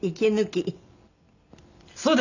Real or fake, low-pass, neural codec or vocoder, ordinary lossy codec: real; 7.2 kHz; none; none